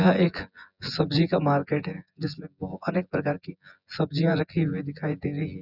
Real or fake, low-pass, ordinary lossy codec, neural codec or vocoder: fake; 5.4 kHz; none; vocoder, 24 kHz, 100 mel bands, Vocos